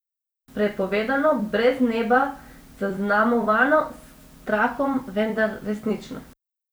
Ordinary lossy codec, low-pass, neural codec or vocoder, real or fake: none; none; vocoder, 44.1 kHz, 128 mel bands every 256 samples, BigVGAN v2; fake